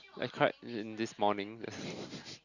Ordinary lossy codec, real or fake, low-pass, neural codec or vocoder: Opus, 64 kbps; real; 7.2 kHz; none